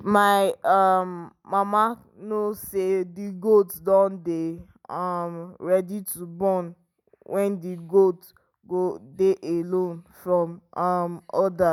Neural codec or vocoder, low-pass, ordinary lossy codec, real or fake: none; 19.8 kHz; none; real